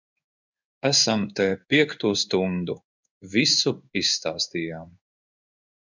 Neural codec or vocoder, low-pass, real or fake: codec, 16 kHz in and 24 kHz out, 1 kbps, XY-Tokenizer; 7.2 kHz; fake